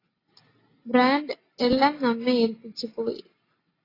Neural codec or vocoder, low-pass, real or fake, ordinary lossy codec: none; 5.4 kHz; real; AAC, 24 kbps